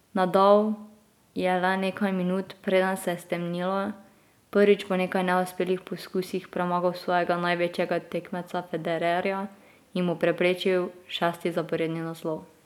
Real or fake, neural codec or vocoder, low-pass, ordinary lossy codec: real; none; 19.8 kHz; none